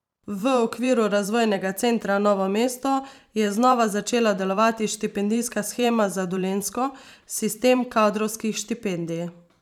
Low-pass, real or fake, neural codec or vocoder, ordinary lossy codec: 19.8 kHz; fake; vocoder, 44.1 kHz, 128 mel bands every 512 samples, BigVGAN v2; none